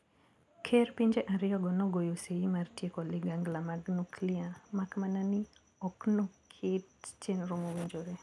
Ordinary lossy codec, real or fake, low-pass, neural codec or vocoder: none; real; none; none